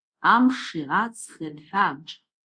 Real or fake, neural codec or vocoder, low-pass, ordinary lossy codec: fake; codec, 24 kHz, 0.5 kbps, DualCodec; 9.9 kHz; Opus, 64 kbps